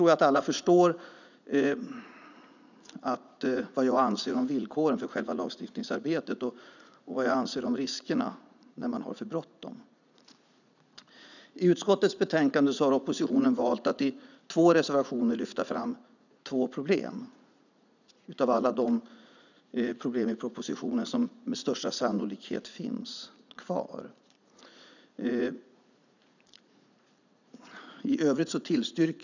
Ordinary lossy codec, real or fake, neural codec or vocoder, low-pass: none; fake; vocoder, 44.1 kHz, 80 mel bands, Vocos; 7.2 kHz